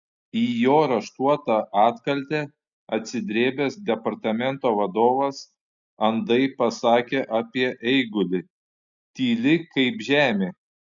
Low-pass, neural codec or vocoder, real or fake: 7.2 kHz; none; real